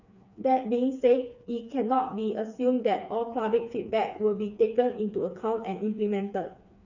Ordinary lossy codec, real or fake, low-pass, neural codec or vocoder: none; fake; 7.2 kHz; codec, 16 kHz, 4 kbps, FreqCodec, smaller model